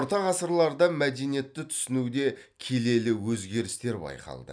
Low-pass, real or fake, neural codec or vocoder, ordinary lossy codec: 9.9 kHz; real; none; none